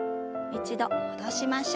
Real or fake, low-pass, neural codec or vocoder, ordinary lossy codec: real; none; none; none